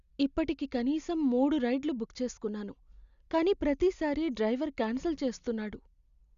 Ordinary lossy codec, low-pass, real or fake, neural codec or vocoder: Opus, 64 kbps; 7.2 kHz; real; none